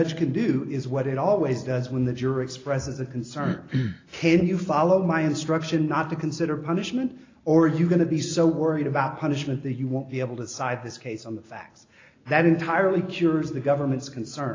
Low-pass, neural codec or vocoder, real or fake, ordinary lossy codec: 7.2 kHz; none; real; AAC, 32 kbps